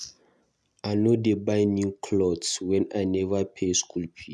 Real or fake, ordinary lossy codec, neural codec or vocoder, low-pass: real; none; none; none